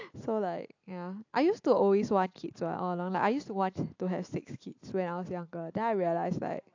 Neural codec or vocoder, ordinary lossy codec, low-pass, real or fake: none; AAC, 48 kbps; 7.2 kHz; real